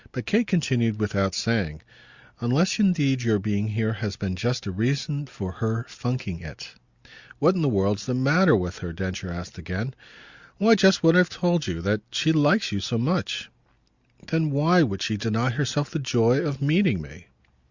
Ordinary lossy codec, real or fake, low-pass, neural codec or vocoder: Opus, 64 kbps; real; 7.2 kHz; none